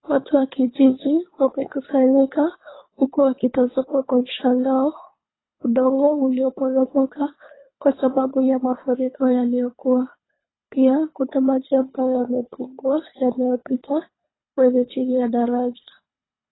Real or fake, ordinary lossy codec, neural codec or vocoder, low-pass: fake; AAC, 16 kbps; codec, 24 kHz, 3 kbps, HILCodec; 7.2 kHz